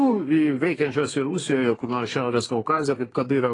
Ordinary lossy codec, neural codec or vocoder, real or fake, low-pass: AAC, 32 kbps; codec, 32 kHz, 1.9 kbps, SNAC; fake; 10.8 kHz